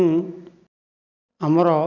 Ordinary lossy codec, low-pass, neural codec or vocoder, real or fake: none; 7.2 kHz; none; real